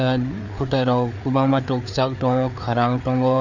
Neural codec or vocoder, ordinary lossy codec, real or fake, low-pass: codec, 16 kHz, 4 kbps, FreqCodec, larger model; none; fake; 7.2 kHz